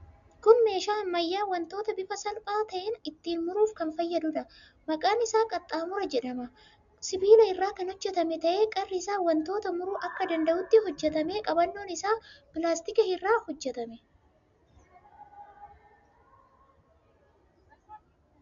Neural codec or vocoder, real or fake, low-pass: none; real; 7.2 kHz